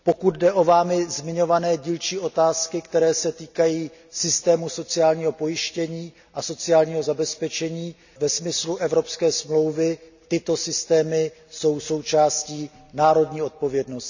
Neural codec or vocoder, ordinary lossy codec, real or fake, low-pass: none; none; real; 7.2 kHz